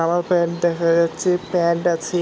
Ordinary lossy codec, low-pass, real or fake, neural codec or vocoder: none; none; real; none